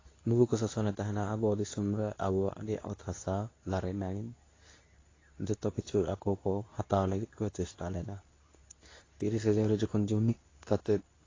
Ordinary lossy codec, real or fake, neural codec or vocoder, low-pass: AAC, 32 kbps; fake; codec, 24 kHz, 0.9 kbps, WavTokenizer, medium speech release version 2; 7.2 kHz